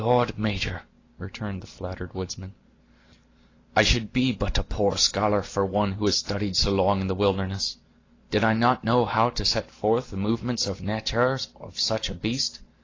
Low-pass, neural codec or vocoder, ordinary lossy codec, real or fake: 7.2 kHz; none; AAC, 32 kbps; real